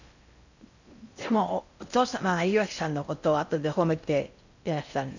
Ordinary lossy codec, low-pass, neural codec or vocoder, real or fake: none; 7.2 kHz; codec, 16 kHz in and 24 kHz out, 0.6 kbps, FocalCodec, streaming, 2048 codes; fake